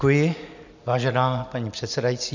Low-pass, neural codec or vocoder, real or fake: 7.2 kHz; none; real